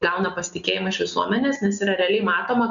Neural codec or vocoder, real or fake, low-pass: none; real; 7.2 kHz